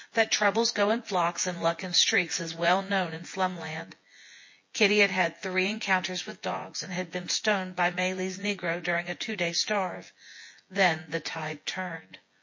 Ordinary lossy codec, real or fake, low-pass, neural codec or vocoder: MP3, 32 kbps; fake; 7.2 kHz; vocoder, 24 kHz, 100 mel bands, Vocos